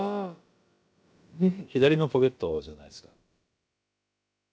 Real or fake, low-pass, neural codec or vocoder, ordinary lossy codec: fake; none; codec, 16 kHz, about 1 kbps, DyCAST, with the encoder's durations; none